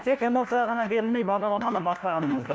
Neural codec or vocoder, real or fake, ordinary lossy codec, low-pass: codec, 16 kHz, 1 kbps, FunCodec, trained on LibriTTS, 50 frames a second; fake; none; none